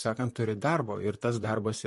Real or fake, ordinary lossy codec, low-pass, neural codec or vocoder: fake; MP3, 48 kbps; 14.4 kHz; vocoder, 44.1 kHz, 128 mel bands, Pupu-Vocoder